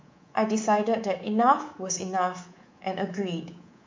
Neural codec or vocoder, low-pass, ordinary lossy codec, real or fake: codec, 24 kHz, 3.1 kbps, DualCodec; 7.2 kHz; MP3, 64 kbps; fake